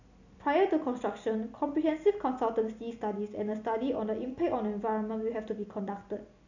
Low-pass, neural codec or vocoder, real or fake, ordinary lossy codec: 7.2 kHz; none; real; none